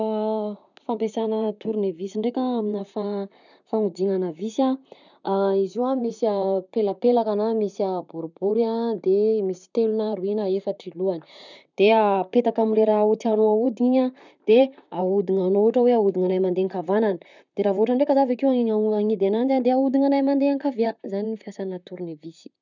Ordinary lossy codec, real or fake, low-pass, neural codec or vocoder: none; fake; 7.2 kHz; vocoder, 44.1 kHz, 128 mel bands, Pupu-Vocoder